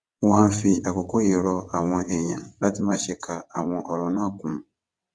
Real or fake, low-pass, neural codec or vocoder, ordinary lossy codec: fake; none; vocoder, 22.05 kHz, 80 mel bands, WaveNeXt; none